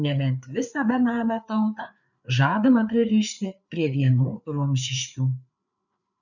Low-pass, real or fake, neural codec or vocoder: 7.2 kHz; fake; codec, 16 kHz, 4 kbps, FreqCodec, larger model